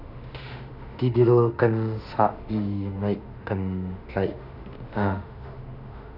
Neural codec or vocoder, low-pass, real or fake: autoencoder, 48 kHz, 32 numbers a frame, DAC-VAE, trained on Japanese speech; 5.4 kHz; fake